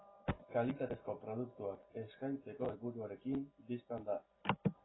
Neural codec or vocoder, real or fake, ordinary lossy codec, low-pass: none; real; AAC, 16 kbps; 7.2 kHz